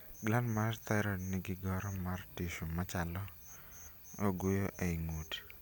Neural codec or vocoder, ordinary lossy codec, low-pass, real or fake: none; none; none; real